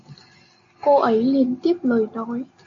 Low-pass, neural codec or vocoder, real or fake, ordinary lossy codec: 7.2 kHz; none; real; AAC, 48 kbps